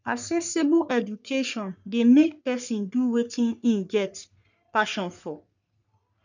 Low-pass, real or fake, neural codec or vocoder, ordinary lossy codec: 7.2 kHz; fake; codec, 44.1 kHz, 3.4 kbps, Pupu-Codec; none